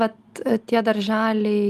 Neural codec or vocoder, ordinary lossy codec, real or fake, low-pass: none; Opus, 32 kbps; real; 14.4 kHz